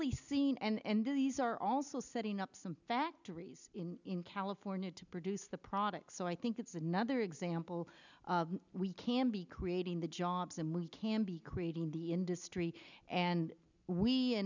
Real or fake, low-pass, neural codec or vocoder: real; 7.2 kHz; none